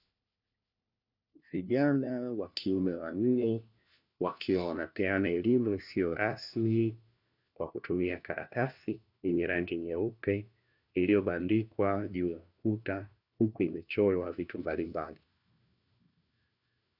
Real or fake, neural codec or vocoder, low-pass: fake; codec, 16 kHz, 1 kbps, FunCodec, trained on LibriTTS, 50 frames a second; 5.4 kHz